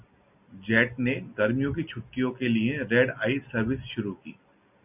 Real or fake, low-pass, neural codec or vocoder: real; 3.6 kHz; none